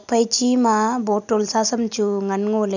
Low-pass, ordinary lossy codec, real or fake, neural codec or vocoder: 7.2 kHz; none; real; none